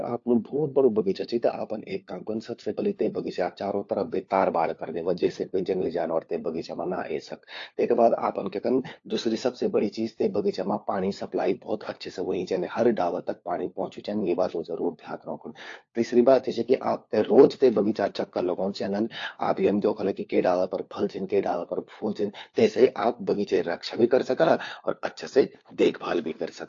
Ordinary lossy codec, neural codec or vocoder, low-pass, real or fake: AAC, 48 kbps; codec, 16 kHz, 4 kbps, FunCodec, trained on LibriTTS, 50 frames a second; 7.2 kHz; fake